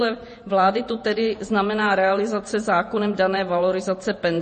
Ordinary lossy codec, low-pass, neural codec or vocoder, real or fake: MP3, 32 kbps; 10.8 kHz; vocoder, 48 kHz, 128 mel bands, Vocos; fake